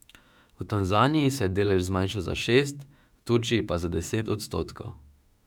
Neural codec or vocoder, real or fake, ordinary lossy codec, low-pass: autoencoder, 48 kHz, 32 numbers a frame, DAC-VAE, trained on Japanese speech; fake; none; 19.8 kHz